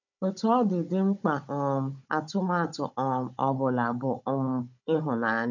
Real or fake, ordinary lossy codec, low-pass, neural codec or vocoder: fake; none; 7.2 kHz; codec, 16 kHz, 16 kbps, FunCodec, trained on Chinese and English, 50 frames a second